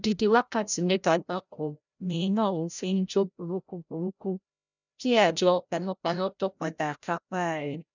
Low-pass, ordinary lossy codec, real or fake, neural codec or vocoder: 7.2 kHz; none; fake; codec, 16 kHz, 0.5 kbps, FreqCodec, larger model